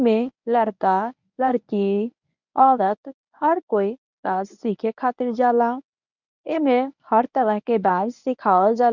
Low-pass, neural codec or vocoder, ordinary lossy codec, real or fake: 7.2 kHz; codec, 24 kHz, 0.9 kbps, WavTokenizer, medium speech release version 1; none; fake